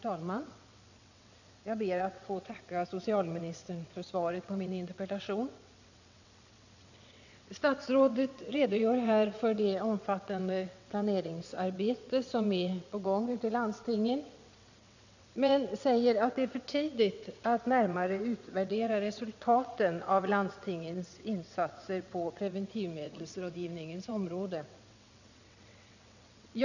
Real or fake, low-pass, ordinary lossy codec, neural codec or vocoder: fake; 7.2 kHz; none; vocoder, 22.05 kHz, 80 mel bands, WaveNeXt